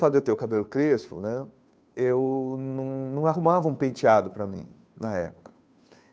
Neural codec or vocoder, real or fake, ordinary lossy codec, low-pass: codec, 16 kHz, 2 kbps, FunCodec, trained on Chinese and English, 25 frames a second; fake; none; none